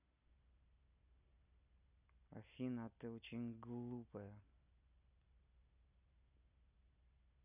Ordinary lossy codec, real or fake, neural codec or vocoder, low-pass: none; real; none; 3.6 kHz